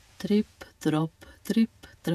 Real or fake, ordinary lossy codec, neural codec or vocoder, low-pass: fake; none; vocoder, 48 kHz, 128 mel bands, Vocos; 14.4 kHz